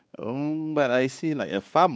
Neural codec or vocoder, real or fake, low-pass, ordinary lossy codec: codec, 16 kHz, 2 kbps, X-Codec, WavLM features, trained on Multilingual LibriSpeech; fake; none; none